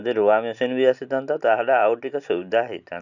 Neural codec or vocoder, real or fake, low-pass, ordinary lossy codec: none; real; 7.2 kHz; none